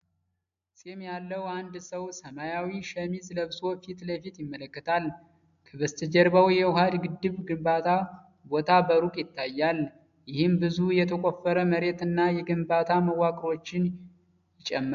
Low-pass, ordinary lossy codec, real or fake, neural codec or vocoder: 7.2 kHz; AAC, 96 kbps; real; none